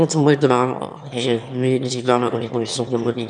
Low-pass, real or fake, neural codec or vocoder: 9.9 kHz; fake; autoencoder, 22.05 kHz, a latent of 192 numbers a frame, VITS, trained on one speaker